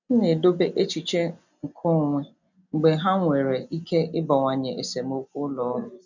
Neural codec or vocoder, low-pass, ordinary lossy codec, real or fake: none; 7.2 kHz; none; real